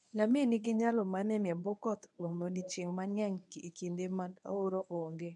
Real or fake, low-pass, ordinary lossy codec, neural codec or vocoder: fake; 10.8 kHz; none; codec, 24 kHz, 0.9 kbps, WavTokenizer, medium speech release version 1